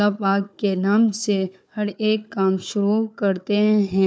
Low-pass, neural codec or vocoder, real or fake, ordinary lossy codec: none; codec, 16 kHz, 4 kbps, FunCodec, trained on Chinese and English, 50 frames a second; fake; none